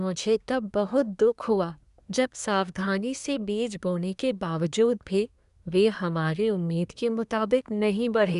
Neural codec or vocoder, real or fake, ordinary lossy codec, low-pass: codec, 24 kHz, 1 kbps, SNAC; fake; none; 10.8 kHz